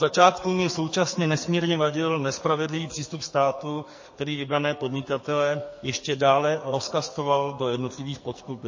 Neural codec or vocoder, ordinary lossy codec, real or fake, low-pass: codec, 44.1 kHz, 2.6 kbps, SNAC; MP3, 32 kbps; fake; 7.2 kHz